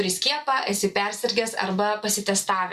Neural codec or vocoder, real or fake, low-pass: none; real; 14.4 kHz